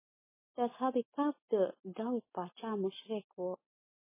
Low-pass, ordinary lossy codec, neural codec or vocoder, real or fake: 3.6 kHz; MP3, 16 kbps; none; real